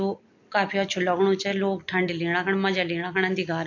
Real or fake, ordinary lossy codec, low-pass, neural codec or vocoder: real; AAC, 48 kbps; 7.2 kHz; none